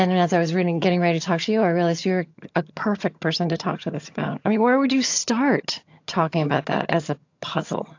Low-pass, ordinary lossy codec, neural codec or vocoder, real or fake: 7.2 kHz; AAC, 48 kbps; vocoder, 22.05 kHz, 80 mel bands, HiFi-GAN; fake